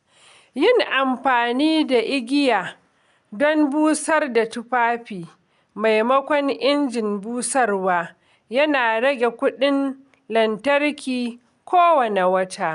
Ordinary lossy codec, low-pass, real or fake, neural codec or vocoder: none; 10.8 kHz; real; none